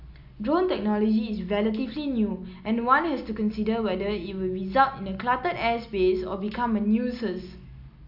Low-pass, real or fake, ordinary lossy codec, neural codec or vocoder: 5.4 kHz; real; AAC, 48 kbps; none